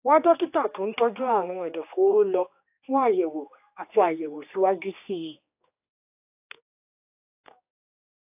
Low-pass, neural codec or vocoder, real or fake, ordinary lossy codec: 3.6 kHz; codec, 16 kHz, 2 kbps, X-Codec, HuBERT features, trained on general audio; fake; AAC, 32 kbps